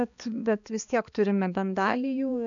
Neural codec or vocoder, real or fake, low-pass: codec, 16 kHz, 2 kbps, X-Codec, HuBERT features, trained on balanced general audio; fake; 7.2 kHz